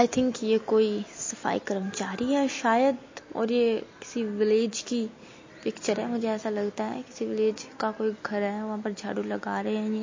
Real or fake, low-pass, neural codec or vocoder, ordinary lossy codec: real; 7.2 kHz; none; MP3, 32 kbps